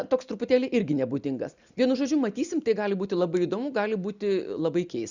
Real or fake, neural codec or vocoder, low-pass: real; none; 7.2 kHz